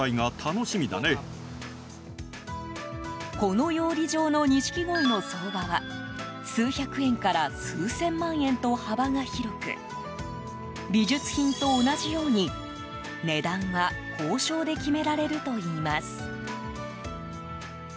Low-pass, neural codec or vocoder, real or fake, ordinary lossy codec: none; none; real; none